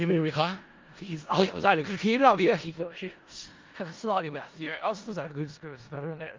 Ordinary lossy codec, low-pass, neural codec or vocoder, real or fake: Opus, 32 kbps; 7.2 kHz; codec, 16 kHz in and 24 kHz out, 0.4 kbps, LongCat-Audio-Codec, four codebook decoder; fake